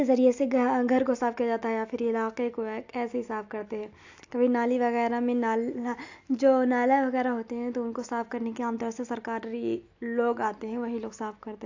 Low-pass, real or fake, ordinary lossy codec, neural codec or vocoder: 7.2 kHz; real; AAC, 48 kbps; none